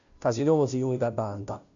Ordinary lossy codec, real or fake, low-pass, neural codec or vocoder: MP3, 96 kbps; fake; 7.2 kHz; codec, 16 kHz, 0.5 kbps, FunCodec, trained on Chinese and English, 25 frames a second